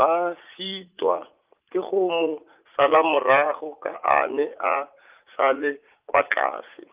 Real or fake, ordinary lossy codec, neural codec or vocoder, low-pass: fake; Opus, 24 kbps; vocoder, 44.1 kHz, 80 mel bands, Vocos; 3.6 kHz